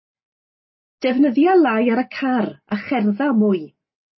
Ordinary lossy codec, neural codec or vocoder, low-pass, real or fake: MP3, 24 kbps; none; 7.2 kHz; real